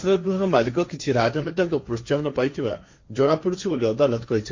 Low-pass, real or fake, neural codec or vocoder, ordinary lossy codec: 7.2 kHz; fake; codec, 16 kHz, 1.1 kbps, Voila-Tokenizer; MP3, 48 kbps